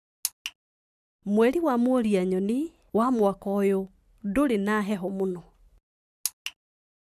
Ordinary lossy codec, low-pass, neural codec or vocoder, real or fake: none; 14.4 kHz; none; real